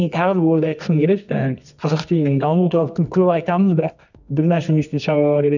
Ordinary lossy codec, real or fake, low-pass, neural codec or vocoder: none; fake; 7.2 kHz; codec, 24 kHz, 0.9 kbps, WavTokenizer, medium music audio release